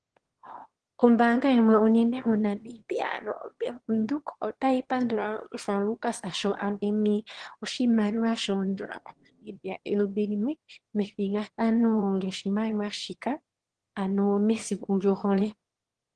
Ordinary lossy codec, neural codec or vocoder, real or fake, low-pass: Opus, 16 kbps; autoencoder, 22.05 kHz, a latent of 192 numbers a frame, VITS, trained on one speaker; fake; 9.9 kHz